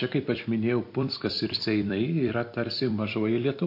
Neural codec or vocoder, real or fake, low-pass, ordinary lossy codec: vocoder, 44.1 kHz, 128 mel bands every 256 samples, BigVGAN v2; fake; 5.4 kHz; MP3, 32 kbps